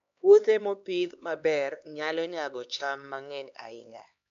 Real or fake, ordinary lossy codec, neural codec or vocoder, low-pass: fake; MP3, 64 kbps; codec, 16 kHz, 2 kbps, X-Codec, HuBERT features, trained on LibriSpeech; 7.2 kHz